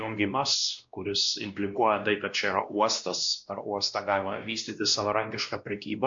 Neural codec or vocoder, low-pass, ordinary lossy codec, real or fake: codec, 16 kHz, 1 kbps, X-Codec, WavLM features, trained on Multilingual LibriSpeech; 7.2 kHz; MP3, 64 kbps; fake